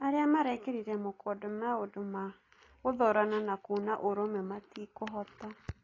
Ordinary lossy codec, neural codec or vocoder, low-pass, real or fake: AAC, 32 kbps; none; 7.2 kHz; real